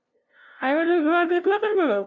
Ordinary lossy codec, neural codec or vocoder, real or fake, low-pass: none; codec, 16 kHz, 0.5 kbps, FunCodec, trained on LibriTTS, 25 frames a second; fake; 7.2 kHz